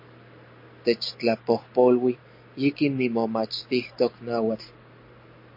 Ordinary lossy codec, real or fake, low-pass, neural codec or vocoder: MP3, 32 kbps; real; 5.4 kHz; none